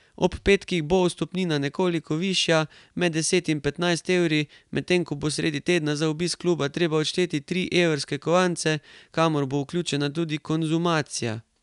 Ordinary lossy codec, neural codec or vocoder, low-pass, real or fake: none; none; 10.8 kHz; real